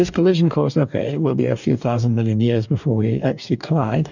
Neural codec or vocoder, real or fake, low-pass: codec, 44.1 kHz, 2.6 kbps, DAC; fake; 7.2 kHz